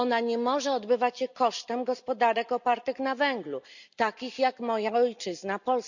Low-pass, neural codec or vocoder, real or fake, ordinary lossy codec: 7.2 kHz; none; real; none